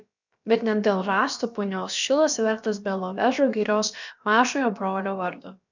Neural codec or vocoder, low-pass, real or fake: codec, 16 kHz, about 1 kbps, DyCAST, with the encoder's durations; 7.2 kHz; fake